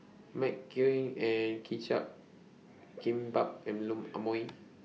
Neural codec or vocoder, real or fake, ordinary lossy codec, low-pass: none; real; none; none